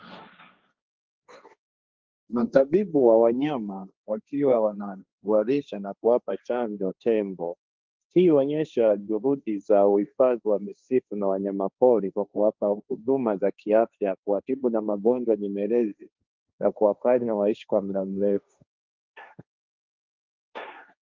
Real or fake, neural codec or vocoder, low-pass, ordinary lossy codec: fake; codec, 16 kHz, 1.1 kbps, Voila-Tokenizer; 7.2 kHz; Opus, 24 kbps